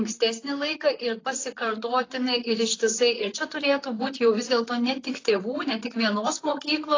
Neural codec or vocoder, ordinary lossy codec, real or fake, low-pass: vocoder, 44.1 kHz, 128 mel bands, Pupu-Vocoder; AAC, 32 kbps; fake; 7.2 kHz